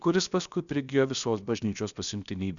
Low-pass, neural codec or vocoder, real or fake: 7.2 kHz; codec, 16 kHz, about 1 kbps, DyCAST, with the encoder's durations; fake